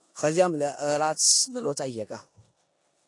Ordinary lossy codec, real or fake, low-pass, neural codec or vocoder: AAC, 48 kbps; fake; 10.8 kHz; codec, 16 kHz in and 24 kHz out, 0.9 kbps, LongCat-Audio-Codec, four codebook decoder